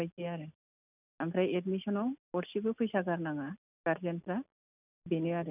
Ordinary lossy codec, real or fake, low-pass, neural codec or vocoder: none; real; 3.6 kHz; none